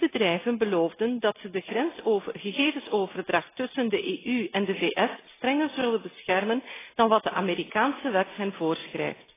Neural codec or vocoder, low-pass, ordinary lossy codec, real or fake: none; 3.6 kHz; AAC, 16 kbps; real